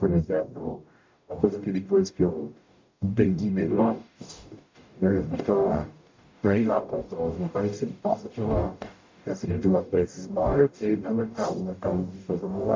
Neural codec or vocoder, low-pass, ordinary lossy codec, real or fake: codec, 44.1 kHz, 0.9 kbps, DAC; 7.2 kHz; MP3, 64 kbps; fake